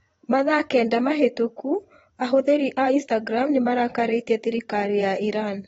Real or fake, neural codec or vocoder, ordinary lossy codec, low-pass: fake; vocoder, 22.05 kHz, 80 mel bands, WaveNeXt; AAC, 24 kbps; 9.9 kHz